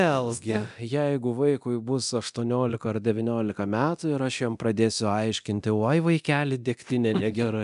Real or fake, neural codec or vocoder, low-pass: fake; codec, 24 kHz, 0.9 kbps, DualCodec; 10.8 kHz